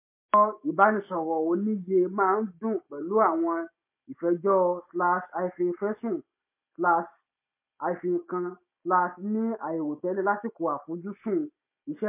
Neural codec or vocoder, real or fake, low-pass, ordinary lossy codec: none; real; 3.6 kHz; MP3, 24 kbps